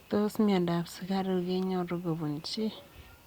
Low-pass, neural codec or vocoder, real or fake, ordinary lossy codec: 19.8 kHz; none; real; Opus, 64 kbps